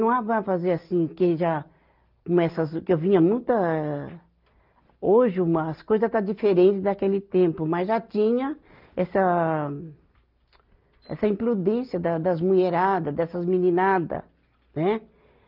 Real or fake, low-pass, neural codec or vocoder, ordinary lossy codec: real; 5.4 kHz; none; Opus, 24 kbps